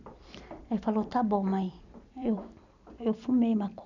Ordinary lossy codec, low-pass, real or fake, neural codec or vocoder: none; 7.2 kHz; fake; vocoder, 44.1 kHz, 128 mel bands every 256 samples, BigVGAN v2